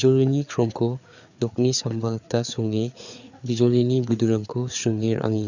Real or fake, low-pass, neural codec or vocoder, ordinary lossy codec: fake; 7.2 kHz; codec, 16 kHz, 4 kbps, FreqCodec, larger model; none